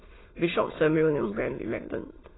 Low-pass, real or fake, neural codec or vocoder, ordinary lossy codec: 7.2 kHz; fake; autoencoder, 22.05 kHz, a latent of 192 numbers a frame, VITS, trained on many speakers; AAC, 16 kbps